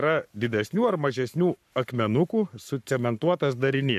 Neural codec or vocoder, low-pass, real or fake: codec, 44.1 kHz, 7.8 kbps, Pupu-Codec; 14.4 kHz; fake